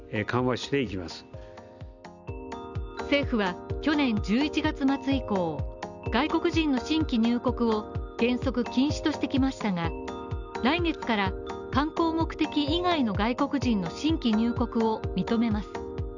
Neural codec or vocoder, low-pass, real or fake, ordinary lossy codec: none; 7.2 kHz; real; none